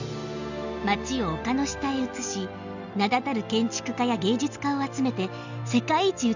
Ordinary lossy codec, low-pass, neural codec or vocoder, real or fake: none; 7.2 kHz; none; real